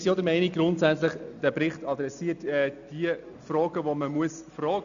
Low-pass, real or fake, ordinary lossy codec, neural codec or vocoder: 7.2 kHz; real; none; none